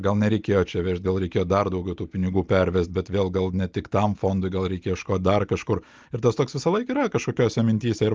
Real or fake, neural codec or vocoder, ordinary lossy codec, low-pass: real; none; Opus, 24 kbps; 7.2 kHz